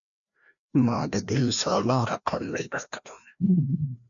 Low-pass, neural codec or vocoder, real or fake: 7.2 kHz; codec, 16 kHz, 1 kbps, FreqCodec, larger model; fake